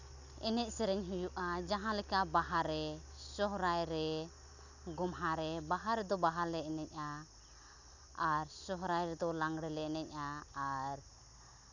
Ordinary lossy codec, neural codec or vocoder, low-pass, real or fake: none; none; 7.2 kHz; real